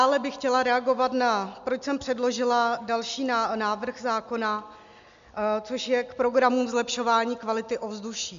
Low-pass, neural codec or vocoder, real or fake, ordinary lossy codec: 7.2 kHz; none; real; MP3, 64 kbps